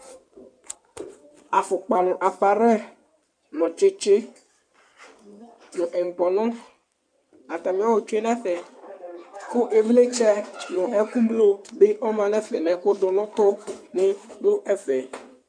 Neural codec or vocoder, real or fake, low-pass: codec, 16 kHz in and 24 kHz out, 2.2 kbps, FireRedTTS-2 codec; fake; 9.9 kHz